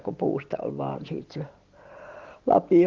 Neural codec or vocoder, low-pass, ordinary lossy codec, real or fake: none; 7.2 kHz; Opus, 16 kbps; real